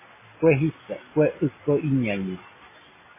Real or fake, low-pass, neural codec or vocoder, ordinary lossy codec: real; 3.6 kHz; none; MP3, 16 kbps